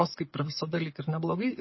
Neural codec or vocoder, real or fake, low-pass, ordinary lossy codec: none; real; 7.2 kHz; MP3, 24 kbps